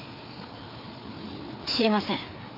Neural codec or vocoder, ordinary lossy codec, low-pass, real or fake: codec, 16 kHz, 4 kbps, FreqCodec, larger model; none; 5.4 kHz; fake